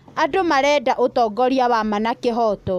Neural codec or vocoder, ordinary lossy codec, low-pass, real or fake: none; none; 14.4 kHz; real